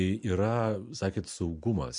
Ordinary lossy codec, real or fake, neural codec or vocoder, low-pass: MP3, 64 kbps; real; none; 9.9 kHz